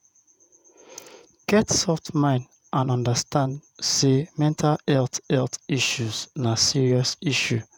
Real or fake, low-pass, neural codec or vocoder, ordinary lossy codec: fake; 19.8 kHz; vocoder, 48 kHz, 128 mel bands, Vocos; none